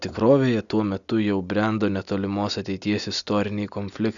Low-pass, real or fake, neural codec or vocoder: 7.2 kHz; real; none